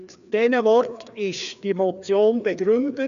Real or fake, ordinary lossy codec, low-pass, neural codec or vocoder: fake; none; 7.2 kHz; codec, 16 kHz, 2 kbps, FreqCodec, larger model